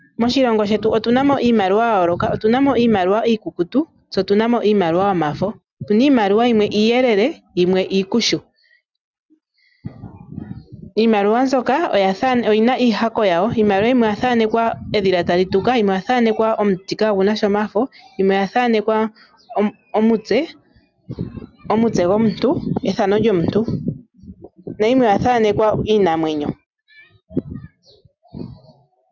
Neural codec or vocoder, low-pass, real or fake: none; 7.2 kHz; real